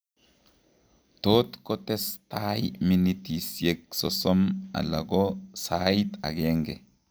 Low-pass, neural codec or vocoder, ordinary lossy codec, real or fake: none; none; none; real